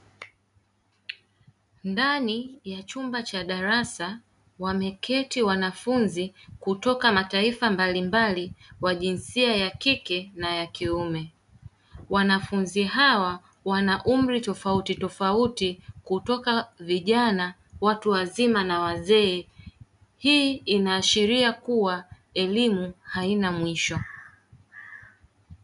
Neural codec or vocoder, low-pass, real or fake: none; 10.8 kHz; real